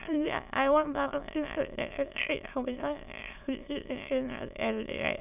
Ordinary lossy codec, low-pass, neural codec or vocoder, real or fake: none; 3.6 kHz; autoencoder, 22.05 kHz, a latent of 192 numbers a frame, VITS, trained on many speakers; fake